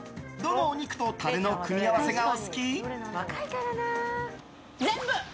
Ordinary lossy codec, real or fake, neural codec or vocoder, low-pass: none; real; none; none